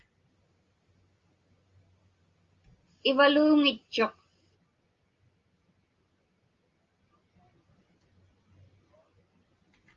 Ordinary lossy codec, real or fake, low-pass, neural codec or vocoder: Opus, 32 kbps; real; 7.2 kHz; none